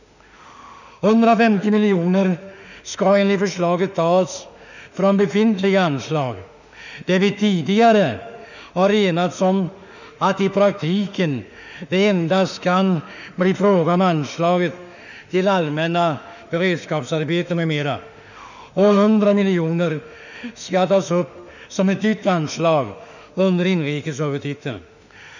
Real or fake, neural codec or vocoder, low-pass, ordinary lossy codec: fake; autoencoder, 48 kHz, 32 numbers a frame, DAC-VAE, trained on Japanese speech; 7.2 kHz; none